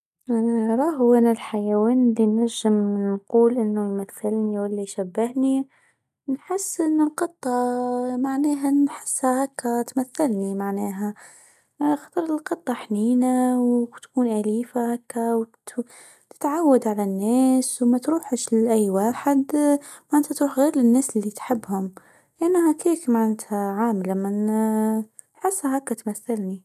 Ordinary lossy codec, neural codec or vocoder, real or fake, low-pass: none; none; real; 14.4 kHz